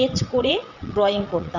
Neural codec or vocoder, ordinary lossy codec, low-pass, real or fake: vocoder, 22.05 kHz, 80 mel bands, WaveNeXt; none; 7.2 kHz; fake